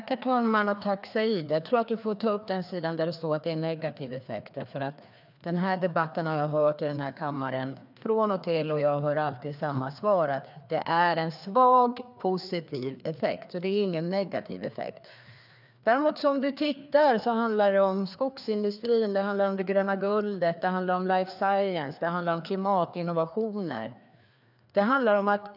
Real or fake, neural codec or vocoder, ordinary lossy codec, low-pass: fake; codec, 16 kHz, 2 kbps, FreqCodec, larger model; none; 5.4 kHz